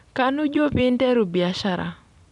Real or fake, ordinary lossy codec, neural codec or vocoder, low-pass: fake; none; vocoder, 44.1 kHz, 128 mel bands every 256 samples, BigVGAN v2; 10.8 kHz